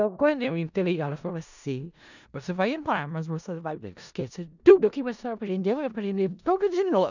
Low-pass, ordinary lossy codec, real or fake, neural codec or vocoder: 7.2 kHz; none; fake; codec, 16 kHz in and 24 kHz out, 0.4 kbps, LongCat-Audio-Codec, four codebook decoder